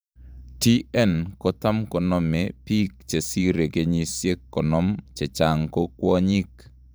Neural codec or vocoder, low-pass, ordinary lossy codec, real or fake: vocoder, 44.1 kHz, 128 mel bands every 512 samples, BigVGAN v2; none; none; fake